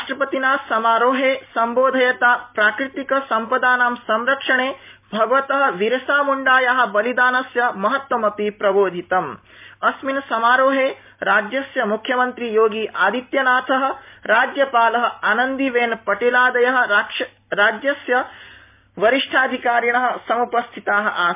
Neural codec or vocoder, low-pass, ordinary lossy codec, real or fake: none; 3.6 kHz; MP3, 32 kbps; real